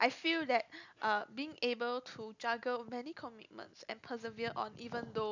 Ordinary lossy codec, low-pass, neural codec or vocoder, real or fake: none; 7.2 kHz; none; real